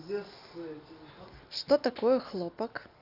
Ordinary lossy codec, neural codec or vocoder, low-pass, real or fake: none; none; 5.4 kHz; real